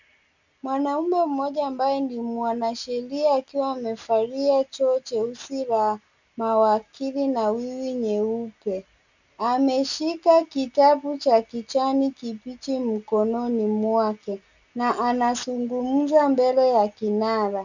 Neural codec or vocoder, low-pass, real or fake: none; 7.2 kHz; real